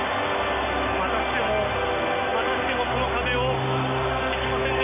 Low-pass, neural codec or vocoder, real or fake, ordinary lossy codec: 3.6 kHz; none; real; MP3, 24 kbps